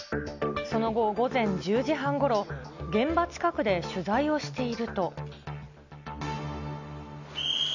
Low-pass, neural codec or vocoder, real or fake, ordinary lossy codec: 7.2 kHz; none; real; none